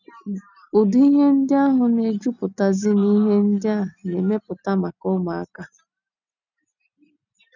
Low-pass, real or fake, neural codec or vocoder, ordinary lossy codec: 7.2 kHz; real; none; none